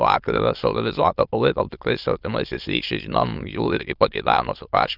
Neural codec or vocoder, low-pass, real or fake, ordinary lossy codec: autoencoder, 22.05 kHz, a latent of 192 numbers a frame, VITS, trained on many speakers; 5.4 kHz; fake; Opus, 32 kbps